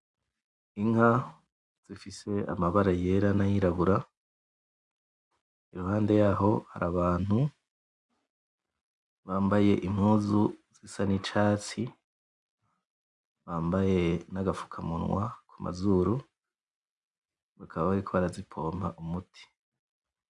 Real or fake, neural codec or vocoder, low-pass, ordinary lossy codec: real; none; 10.8 kHz; MP3, 96 kbps